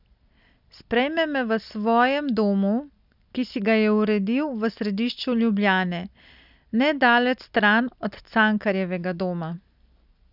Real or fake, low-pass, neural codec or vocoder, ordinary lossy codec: real; 5.4 kHz; none; none